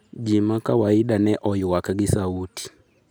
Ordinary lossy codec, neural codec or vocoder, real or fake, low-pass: none; none; real; none